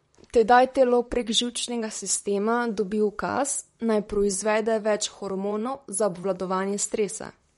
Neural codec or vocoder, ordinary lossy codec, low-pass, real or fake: vocoder, 44.1 kHz, 128 mel bands, Pupu-Vocoder; MP3, 48 kbps; 19.8 kHz; fake